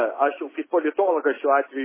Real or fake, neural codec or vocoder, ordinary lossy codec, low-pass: real; none; MP3, 16 kbps; 3.6 kHz